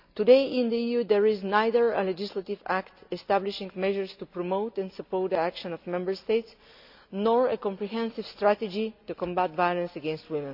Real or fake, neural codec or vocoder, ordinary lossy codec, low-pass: real; none; none; 5.4 kHz